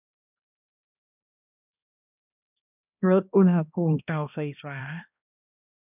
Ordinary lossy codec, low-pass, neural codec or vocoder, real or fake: none; 3.6 kHz; codec, 16 kHz, 1 kbps, X-Codec, HuBERT features, trained on balanced general audio; fake